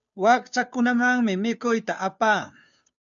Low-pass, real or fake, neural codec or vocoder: 7.2 kHz; fake; codec, 16 kHz, 2 kbps, FunCodec, trained on Chinese and English, 25 frames a second